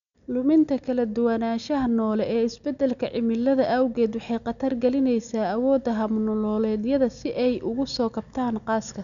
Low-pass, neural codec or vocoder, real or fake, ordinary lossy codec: 7.2 kHz; none; real; none